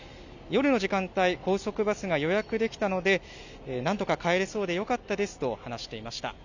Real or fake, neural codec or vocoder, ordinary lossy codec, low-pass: real; none; none; 7.2 kHz